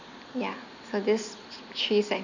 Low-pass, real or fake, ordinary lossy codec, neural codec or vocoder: 7.2 kHz; real; none; none